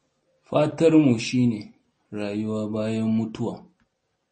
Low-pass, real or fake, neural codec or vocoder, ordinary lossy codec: 10.8 kHz; real; none; MP3, 32 kbps